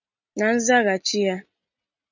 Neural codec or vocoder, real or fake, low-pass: none; real; 7.2 kHz